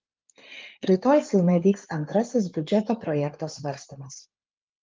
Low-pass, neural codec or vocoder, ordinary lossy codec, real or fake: 7.2 kHz; codec, 16 kHz in and 24 kHz out, 2.2 kbps, FireRedTTS-2 codec; Opus, 32 kbps; fake